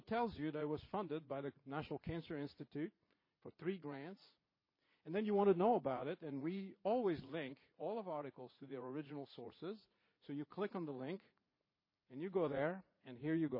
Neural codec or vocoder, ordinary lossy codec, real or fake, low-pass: vocoder, 44.1 kHz, 80 mel bands, Vocos; MP3, 24 kbps; fake; 5.4 kHz